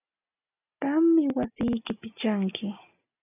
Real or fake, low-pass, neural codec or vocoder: real; 3.6 kHz; none